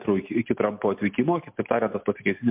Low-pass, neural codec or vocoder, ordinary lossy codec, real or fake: 3.6 kHz; none; MP3, 32 kbps; real